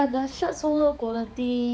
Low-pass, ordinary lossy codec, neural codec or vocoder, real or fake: none; none; codec, 16 kHz, 4 kbps, X-Codec, HuBERT features, trained on balanced general audio; fake